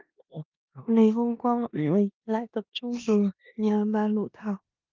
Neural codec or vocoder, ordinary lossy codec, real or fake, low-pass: codec, 16 kHz in and 24 kHz out, 0.9 kbps, LongCat-Audio-Codec, four codebook decoder; Opus, 24 kbps; fake; 7.2 kHz